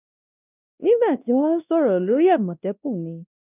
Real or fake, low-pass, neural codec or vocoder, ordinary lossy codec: fake; 3.6 kHz; codec, 16 kHz, 1 kbps, X-Codec, WavLM features, trained on Multilingual LibriSpeech; none